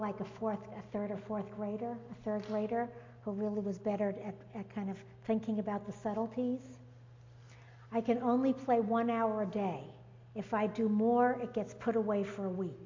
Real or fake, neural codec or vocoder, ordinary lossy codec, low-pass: real; none; MP3, 48 kbps; 7.2 kHz